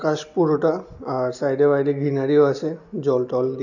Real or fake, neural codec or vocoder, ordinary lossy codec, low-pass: real; none; none; 7.2 kHz